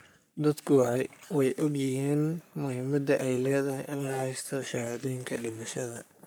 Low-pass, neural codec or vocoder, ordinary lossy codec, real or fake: none; codec, 44.1 kHz, 3.4 kbps, Pupu-Codec; none; fake